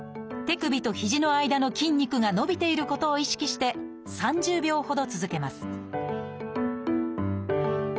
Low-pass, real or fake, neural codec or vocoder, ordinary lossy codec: none; real; none; none